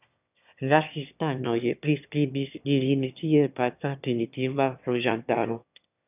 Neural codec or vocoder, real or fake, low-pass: autoencoder, 22.05 kHz, a latent of 192 numbers a frame, VITS, trained on one speaker; fake; 3.6 kHz